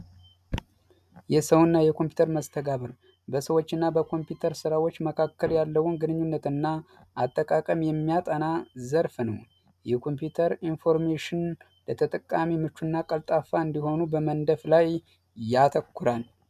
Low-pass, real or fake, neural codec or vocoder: 14.4 kHz; real; none